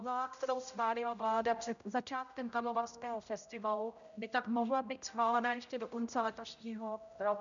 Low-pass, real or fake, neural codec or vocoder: 7.2 kHz; fake; codec, 16 kHz, 0.5 kbps, X-Codec, HuBERT features, trained on general audio